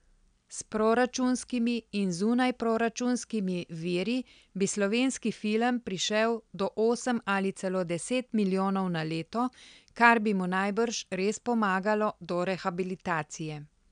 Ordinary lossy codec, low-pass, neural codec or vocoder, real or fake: none; 9.9 kHz; none; real